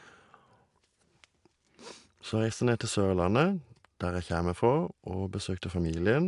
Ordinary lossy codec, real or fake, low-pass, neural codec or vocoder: AAC, 64 kbps; real; 10.8 kHz; none